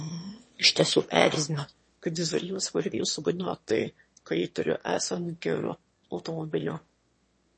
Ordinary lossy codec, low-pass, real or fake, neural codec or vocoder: MP3, 32 kbps; 9.9 kHz; fake; autoencoder, 22.05 kHz, a latent of 192 numbers a frame, VITS, trained on one speaker